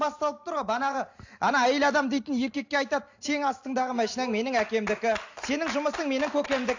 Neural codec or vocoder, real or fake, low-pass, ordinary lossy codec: none; real; 7.2 kHz; AAC, 48 kbps